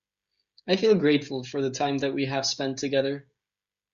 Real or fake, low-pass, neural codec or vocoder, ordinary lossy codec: fake; 7.2 kHz; codec, 16 kHz, 16 kbps, FreqCodec, smaller model; Opus, 64 kbps